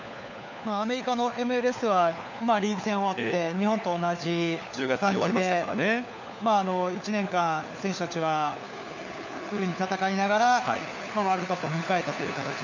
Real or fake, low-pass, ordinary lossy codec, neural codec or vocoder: fake; 7.2 kHz; none; codec, 16 kHz, 4 kbps, FunCodec, trained on LibriTTS, 50 frames a second